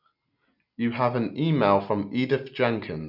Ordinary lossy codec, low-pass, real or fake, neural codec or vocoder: none; 5.4 kHz; real; none